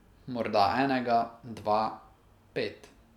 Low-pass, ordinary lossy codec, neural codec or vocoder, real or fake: 19.8 kHz; none; none; real